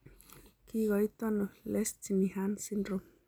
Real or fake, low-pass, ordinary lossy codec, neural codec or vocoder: real; none; none; none